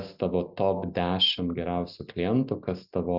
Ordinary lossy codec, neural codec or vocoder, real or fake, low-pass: Opus, 64 kbps; none; real; 5.4 kHz